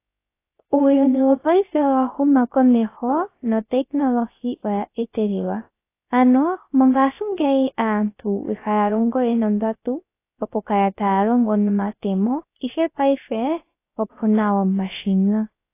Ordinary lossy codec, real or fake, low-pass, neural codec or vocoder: AAC, 24 kbps; fake; 3.6 kHz; codec, 16 kHz, 0.3 kbps, FocalCodec